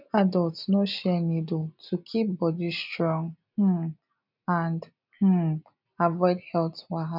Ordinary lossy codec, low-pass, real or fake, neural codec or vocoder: none; 5.4 kHz; real; none